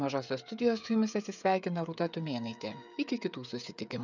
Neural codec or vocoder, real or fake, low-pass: codec, 16 kHz, 16 kbps, FreqCodec, smaller model; fake; 7.2 kHz